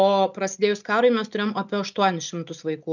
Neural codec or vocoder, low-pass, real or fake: none; 7.2 kHz; real